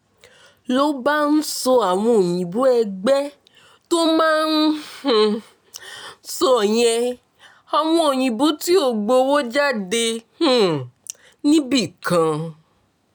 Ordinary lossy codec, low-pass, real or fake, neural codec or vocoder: none; none; real; none